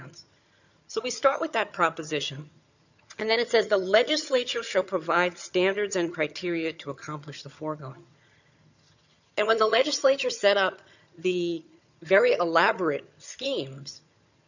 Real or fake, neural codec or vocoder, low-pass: fake; vocoder, 22.05 kHz, 80 mel bands, HiFi-GAN; 7.2 kHz